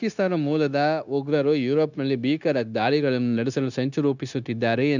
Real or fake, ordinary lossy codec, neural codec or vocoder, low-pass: fake; none; codec, 16 kHz, 0.9 kbps, LongCat-Audio-Codec; 7.2 kHz